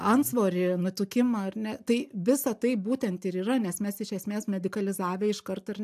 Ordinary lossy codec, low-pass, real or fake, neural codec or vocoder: AAC, 96 kbps; 14.4 kHz; real; none